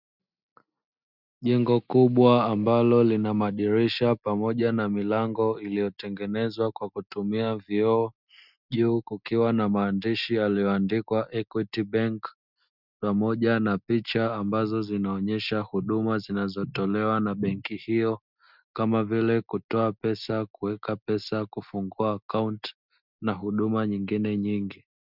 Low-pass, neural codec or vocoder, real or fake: 5.4 kHz; none; real